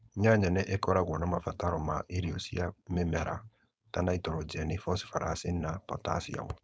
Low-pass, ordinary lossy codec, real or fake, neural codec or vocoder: none; none; fake; codec, 16 kHz, 4.8 kbps, FACodec